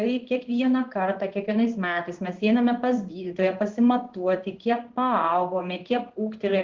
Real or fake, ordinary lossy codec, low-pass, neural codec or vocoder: fake; Opus, 16 kbps; 7.2 kHz; codec, 16 kHz in and 24 kHz out, 1 kbps, XY-Tokenizer